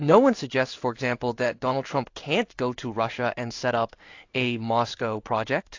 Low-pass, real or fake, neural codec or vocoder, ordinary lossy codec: 7.2 kHz; fake; codec, 16 kHz in and 24 kHz out, 1 kbps, XY-Tokenizer; AAC, 48 kbps